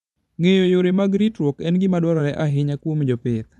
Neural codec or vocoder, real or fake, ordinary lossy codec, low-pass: vocoder, 24 kHz, 100 mel bands, Vocos; fake; none; none